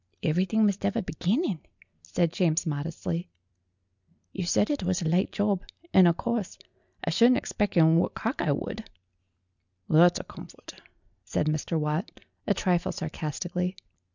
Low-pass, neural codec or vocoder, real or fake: 7.2 kHz; none; real